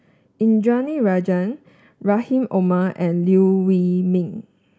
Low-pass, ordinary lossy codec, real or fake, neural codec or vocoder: none; none; real; none